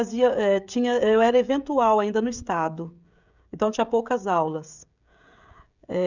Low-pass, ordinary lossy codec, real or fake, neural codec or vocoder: 7.2 kHz; none; fake; codec, 16 kHz, 16 kbps, FreqCodec, smaller model